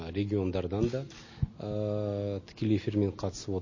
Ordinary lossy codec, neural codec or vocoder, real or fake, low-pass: MP3, 32 kbps; none; real; 7.2 kHz